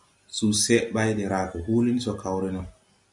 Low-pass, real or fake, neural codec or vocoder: 10.8 kHz; real; none